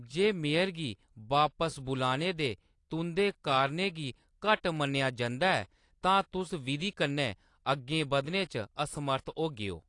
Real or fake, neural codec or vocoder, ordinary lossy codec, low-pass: real; none; AAC, 48 kbps; 10.8 kHz